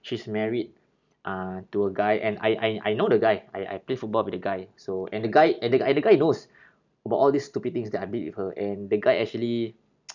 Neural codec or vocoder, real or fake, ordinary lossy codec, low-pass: none; real; none; 7.2 kHz